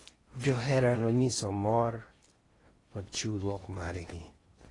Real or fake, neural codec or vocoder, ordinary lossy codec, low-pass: fake; codec, 16 kHz in and 24 kHz out, 0.6 kbps, FocalCodec, streaming, 4096 codes; AAC, 32 kbps; 10.8 kHz